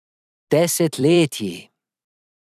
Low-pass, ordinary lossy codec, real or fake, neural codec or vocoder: 14.4 kHz; none; fake; vocoder, 44.1 kHz, 128 mel bands every 256 samples, BigVGAN v2